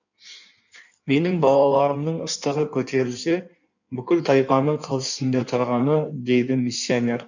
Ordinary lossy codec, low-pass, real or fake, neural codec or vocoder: none; 7.2 kHz; fake; codec, 16 kHz in and 24 kHz out, 1.1 kbps, FireRedTTS-2 codec